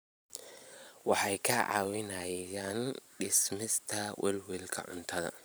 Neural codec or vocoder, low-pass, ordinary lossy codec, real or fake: vocoder, 44.1 kHz, 128 mel bands every 512 samples, BigVGAN v2; none; none; fake